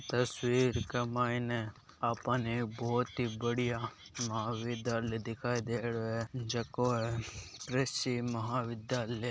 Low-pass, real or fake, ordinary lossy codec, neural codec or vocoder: none; real; none; none